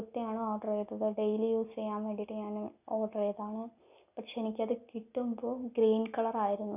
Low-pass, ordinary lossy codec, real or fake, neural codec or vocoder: 3.6 kHz; none; real; none